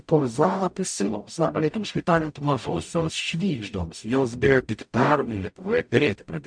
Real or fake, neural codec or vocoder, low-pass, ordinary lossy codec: fake; codec, 44.1 kHz, 0.9 kbps, DAC; 9.9 kHz; MP3, 64 kbps